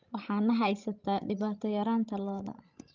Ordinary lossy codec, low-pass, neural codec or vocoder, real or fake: Opus, 32 kbps; 7.2 kHz; codec, 16 kHz, 16 kbps, FreqCodec, larger model; fake